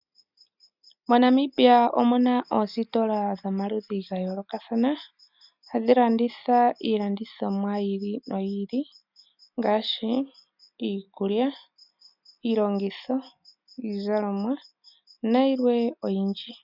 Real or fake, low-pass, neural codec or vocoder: real; 5.4 kHz; none